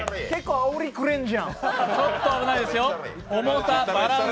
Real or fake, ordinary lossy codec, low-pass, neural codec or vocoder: real; none; none; none